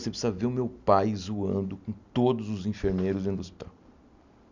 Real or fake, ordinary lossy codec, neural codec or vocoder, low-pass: real; none; none; 7.2 kHz